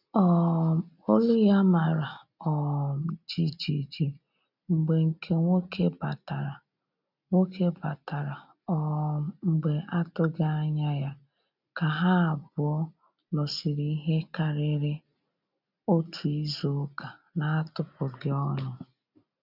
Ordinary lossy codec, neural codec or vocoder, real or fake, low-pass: none; none; real; 5.4 kHz